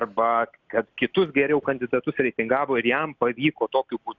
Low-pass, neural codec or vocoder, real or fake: 7.2 kHz; none; real